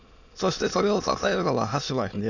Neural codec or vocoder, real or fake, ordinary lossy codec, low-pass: autoencoder, 22.05 kHz, a latent of 192 numbers a frame, VITS, trained on many speakers; fake; none; 7.2 kHz